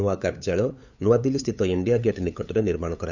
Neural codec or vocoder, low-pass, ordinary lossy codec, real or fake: codec, 16 kHz, 8 kbps, FunCodec, trained on LibriTTS, 25 frames a second; 7.2 kHz; none; fake